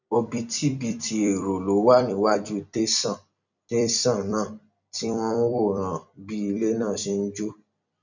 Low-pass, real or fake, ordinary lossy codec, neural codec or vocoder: 7.2 kHz; fake; none; vocoder, 44.1 kHz, 128 mel bands every 256 samples, BigVGAN v2